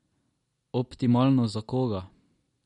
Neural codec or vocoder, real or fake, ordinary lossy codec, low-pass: none; real; MP3, 48 kbps; 19.8 kHz